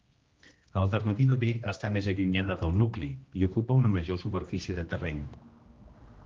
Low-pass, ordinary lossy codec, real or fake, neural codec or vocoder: 7.2 kHz; Opus, 32 kbps; fake; codec, 16 kHz, 1 kbps, X-Codec, HuBERT features, trained on general audio